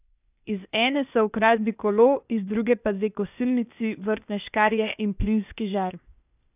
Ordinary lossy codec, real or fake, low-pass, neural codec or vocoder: none; fake; 3.6 kHz; codec, 16 kHz, 0.8 kbps, ZipCodec